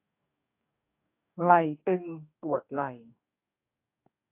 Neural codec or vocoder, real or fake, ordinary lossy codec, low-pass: codec, 44.1 kHz, 2.6 kbps, DAC; fake; AAC, 32 kbps; 3.6 kHz